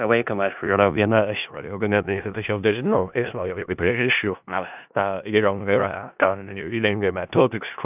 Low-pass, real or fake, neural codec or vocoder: 3.6 kHz; fake; codec, 16 kHz in and 24 kHz out, 0.4 kbps, LongCat-Audio-Codec, four codebook decoder